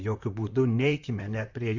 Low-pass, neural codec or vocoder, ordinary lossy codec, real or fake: 7.2 kHz; vocoder, 44.1 kHz, 128 mel bands, Pupu-Vocoder; Opus, 64 kbps; fake